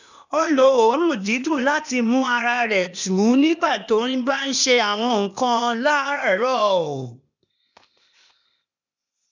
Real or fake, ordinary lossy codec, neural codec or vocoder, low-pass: fake; none; codec, 16 kHz, 0.8 kbps, ZipCodec; 7.2 kHz